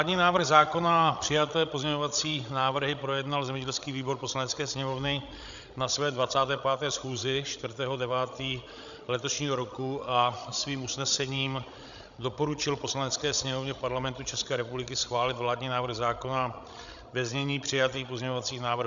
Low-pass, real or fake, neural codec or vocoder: 7.2 kHz; fake; codec, 16 kHz, 16 kbps, FreqCodec, larger model